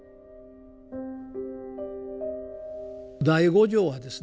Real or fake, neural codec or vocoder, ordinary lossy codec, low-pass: real; none; none; none